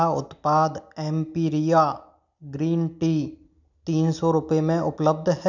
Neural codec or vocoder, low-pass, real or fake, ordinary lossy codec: none; 7.2 kHz; real; none